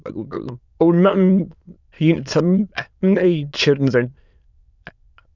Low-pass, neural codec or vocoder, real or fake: 7.2 kHz; autoencoder, 22.05 kHz, a latent of 192 numbers a frame, VITS, trained on many speakers; fake